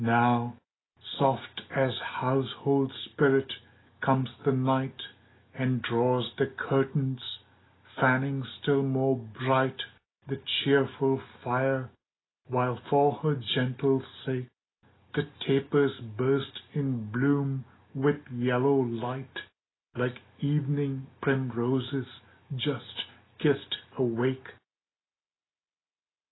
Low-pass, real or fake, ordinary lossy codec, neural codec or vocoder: 7.2 kHz; real; AAC, 16 kbps; none